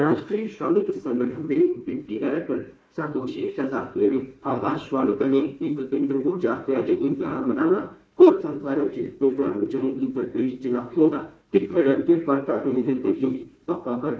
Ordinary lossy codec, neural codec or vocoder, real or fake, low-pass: none; codec, 16 kHz, 1 kbps, FunCodec, trained on Chinese and English, 50 frames a second; fake; none